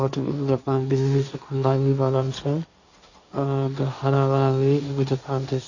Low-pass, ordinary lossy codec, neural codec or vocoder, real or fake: 7.2 kHz; none; codec, 16 kHz, 1.1 kbps, Voila-Tokenizer; fake